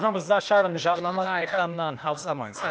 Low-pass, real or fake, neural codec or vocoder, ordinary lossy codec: none; fake; codec, 16 kHz, 0.8 kbps, ZipCodec; none